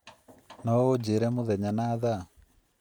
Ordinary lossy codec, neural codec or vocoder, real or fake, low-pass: none; vocoder, 44.1 kHz, 128 mel bands every 512 samples, BigVGAN v2; fake; none